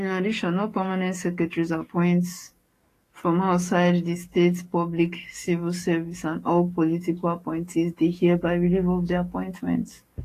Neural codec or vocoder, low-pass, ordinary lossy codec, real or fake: codec, 44.1 kHz, 7.8 kbps, DAC; 14.4 kHz; AAC, 48 kbps; fake